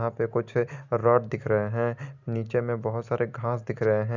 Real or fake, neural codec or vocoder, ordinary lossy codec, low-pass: real; none; none; 7.2 kHz